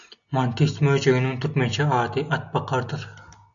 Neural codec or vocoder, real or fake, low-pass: none; real; 7.2 kHz